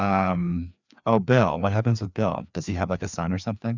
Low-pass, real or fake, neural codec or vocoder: 7.2 kHz; fake; codec, 16 kHz, 2 kbps, FreqCodec, larger model